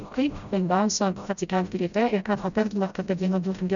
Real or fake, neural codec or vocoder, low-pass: fake; codec, 16 kHz, 0.5 kbps, FreqCodec, smaller model; 7.2 kHz